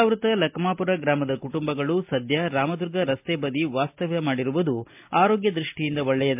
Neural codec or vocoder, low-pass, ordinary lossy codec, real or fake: none; 3.6 kHz; MP3, 32 kbps; real